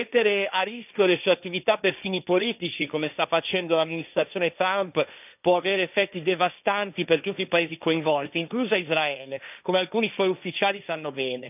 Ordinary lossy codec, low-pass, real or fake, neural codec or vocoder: none; 3.6 kHz; fake; codec, 16 kHz, 1.1 kbps, Voila-Tokenizer